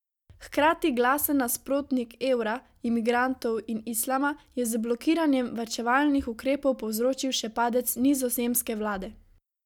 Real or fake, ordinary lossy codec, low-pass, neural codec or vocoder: real; none; 19.8 kHz; none